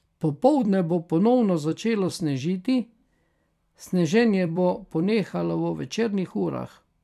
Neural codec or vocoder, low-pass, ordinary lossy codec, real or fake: vocoder, 44.1 kHz, 128 mel bands every 256 samples, BigVGAN v2; 14.4 kHz; none; fake